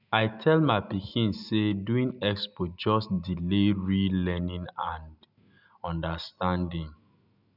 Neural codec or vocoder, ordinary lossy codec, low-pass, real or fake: none; none; 5.4 kHz; real